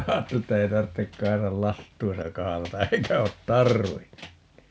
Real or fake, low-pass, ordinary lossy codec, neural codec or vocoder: real; none; none; none